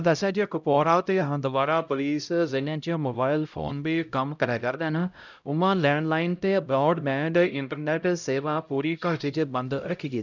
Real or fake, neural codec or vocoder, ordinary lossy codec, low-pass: fake; codec, 16 kHz, 0.5 kbps, X-Codec, HuBERT features, trained on LibriSpeech; none; 7.2 kHz